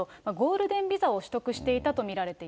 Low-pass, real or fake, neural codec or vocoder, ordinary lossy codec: none; real; none; none